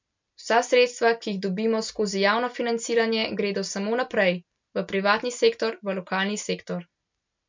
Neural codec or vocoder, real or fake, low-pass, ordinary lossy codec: none; real; 7.2 kHz; MP3, 64 kbps